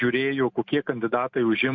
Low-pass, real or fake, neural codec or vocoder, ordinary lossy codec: 7.2 kHz; real; none; MP3, 48 kbps